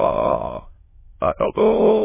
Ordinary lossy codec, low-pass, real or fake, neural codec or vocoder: MP3, 16 kbps; 3.6 kHz; fake; autoencoder, 22.05 kHz, a latent of 192 numbers a frame, VITS, trained on many speakers